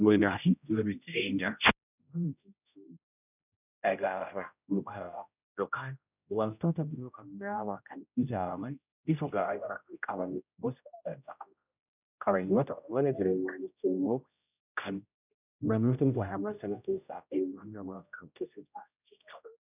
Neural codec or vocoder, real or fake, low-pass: codec, 16 kHz, 0.5 kbps, X-Codec, HuBERT features, trained on general audio; fake; 3.6 kHz